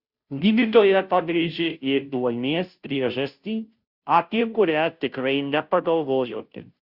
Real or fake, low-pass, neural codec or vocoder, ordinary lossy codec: fake; 5.4 kHz; codec, 16 kHz, 0.5 kbps, FunCodec, trained on Chinese and English, 25 frames a second; Opus, 64 kbps